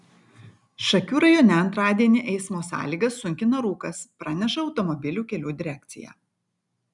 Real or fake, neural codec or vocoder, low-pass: real; none; 10.8 kHz